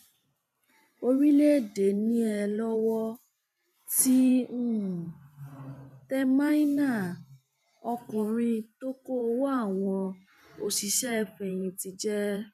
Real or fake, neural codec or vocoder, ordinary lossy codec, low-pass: fake; vocoder, 44.1 kHz, 128 mel bands every 512 samples, BigVGAN v2; none; 14.4 kHz